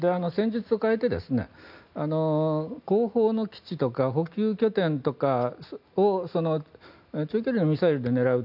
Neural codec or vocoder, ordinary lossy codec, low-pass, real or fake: none; none; 5.4 kHz; real